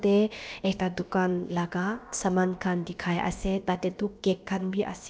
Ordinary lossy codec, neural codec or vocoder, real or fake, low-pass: none; codec, 16 kHz, about 1 kbps, DyCAST, with the encoder's durations; fake; none